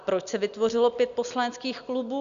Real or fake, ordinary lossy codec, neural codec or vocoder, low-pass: real; AAC, 96 kbps; none; 7.2 kHz